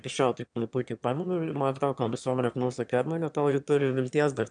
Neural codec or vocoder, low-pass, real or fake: autoencoder, 22.05 kHz, a latent of 192 numbers a frame, VITS, trained on one speaker; 9.9 kHz; fake